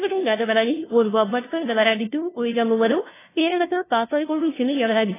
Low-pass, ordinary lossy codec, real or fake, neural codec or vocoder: 3.6 kHz; AAC, 16 kbps; fake; codec, 16 kHz, 0.5 kbps, FunCodec, trained on LibriTTS, 25 frames a second